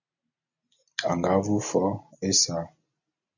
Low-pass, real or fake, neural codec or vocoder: 7.2 kHz; real; none